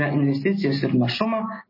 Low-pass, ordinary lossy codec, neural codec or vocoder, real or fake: 5.4 kHz; MP3, 24 kbps; none; real